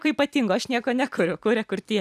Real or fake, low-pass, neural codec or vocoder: fake; 14.4 kHz; vocoder, 44.1 kHz, 128 mel bands every 256 samples, BigVGAN v2